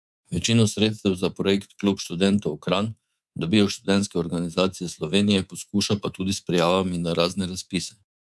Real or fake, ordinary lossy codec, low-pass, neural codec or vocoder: fake; none; none; codec, 24 kHz, 3.1 kbps, DualCodec